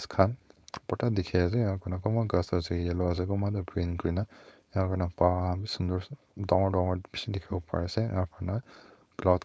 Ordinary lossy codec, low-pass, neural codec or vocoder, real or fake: none; none; codec, 16 kHz, 4.8 kbps, FACodec; fake